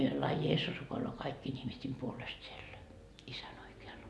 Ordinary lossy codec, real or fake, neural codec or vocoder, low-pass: none; real; none; none